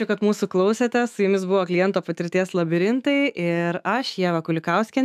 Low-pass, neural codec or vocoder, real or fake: 14.4 kHz; autoencoder, 48 kHz, 128 numbers a frame, DAC-VAE, trained on Japanese speech; fake